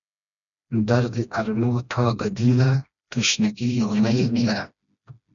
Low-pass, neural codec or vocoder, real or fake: 7.2 kHz; codec, 16 kHz, 1 kbps, FreqCodec, smaller model; fake